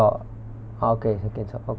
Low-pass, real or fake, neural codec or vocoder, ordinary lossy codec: none; real; none; none